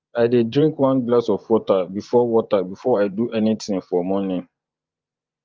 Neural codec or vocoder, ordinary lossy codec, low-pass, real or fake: vocoder, 24 kHz, 100 mel bands, Vocos; Opus, 32 kbps; 7.2 kHz; fake